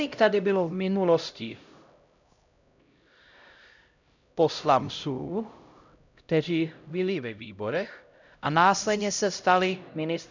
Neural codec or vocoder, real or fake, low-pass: codec, 16 kHz, 0.5 kbps, X-Codec, HuBERT features, trained on LibriSpeech; fake; 7.2 kHz